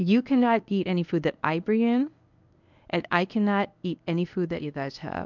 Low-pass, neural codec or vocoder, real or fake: 7.2 kHz; codec, 24 kHz, 0.9 kbps, WavTokenizer, medium speech release version 1; fake